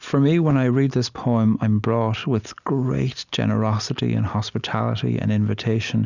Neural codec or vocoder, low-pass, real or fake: none; 7.2 kHz; real